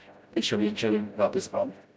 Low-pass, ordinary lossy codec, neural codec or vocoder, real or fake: none; none; codec, 16 kHz, 0.5 kbps, FreqCodec, smaller model; fake